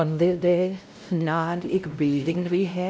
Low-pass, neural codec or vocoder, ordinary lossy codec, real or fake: none; codec, 16 kHz, 0.5 kbps, X-Codec, WavLM features, trained on Multilingual LibriSpeech; none; fake